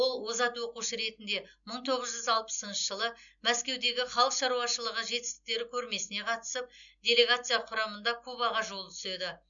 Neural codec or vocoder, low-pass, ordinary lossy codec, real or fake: none; 7.2 kHz; none; real